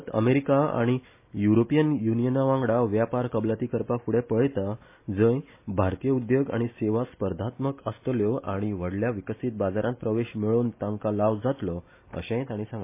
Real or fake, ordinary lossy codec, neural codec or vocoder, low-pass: real; MP3, 24 kbps; none; 3.6 kHz